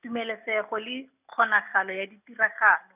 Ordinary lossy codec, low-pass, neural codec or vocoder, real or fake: none; 3.6 kHz; none; real